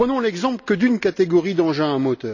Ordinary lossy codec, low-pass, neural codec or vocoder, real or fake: none; 7.2 kHz; none; real